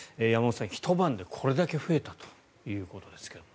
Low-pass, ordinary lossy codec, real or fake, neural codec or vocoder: none; none; real; none